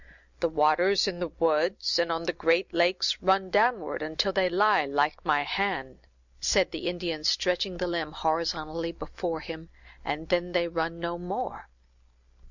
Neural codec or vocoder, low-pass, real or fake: none; 7.2 kHz; real